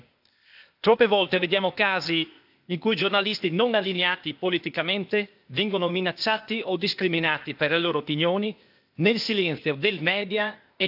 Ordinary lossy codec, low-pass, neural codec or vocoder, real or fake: AAC, 48 kbps; 5.4 kHz; codec, 16 kHz, 0.8 kbps, ZipCodec; fake